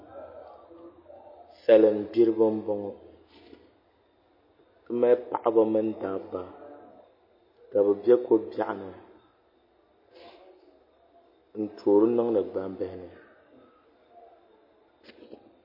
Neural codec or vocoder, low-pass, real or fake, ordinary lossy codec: none; 5.4 kHz; real; MP3, 24 kbps